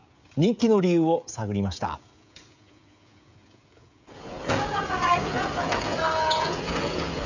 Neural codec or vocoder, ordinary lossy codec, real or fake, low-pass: codec, 16 kHz, 16 kbps, FreqCodec, smaller model; none; fake; 7.2 kHz